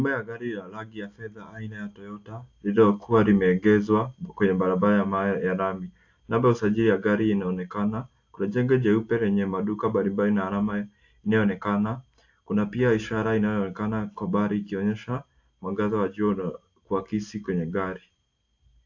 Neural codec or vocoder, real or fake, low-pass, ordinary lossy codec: none; real; 7.2 kHz; AAC, 48 kbps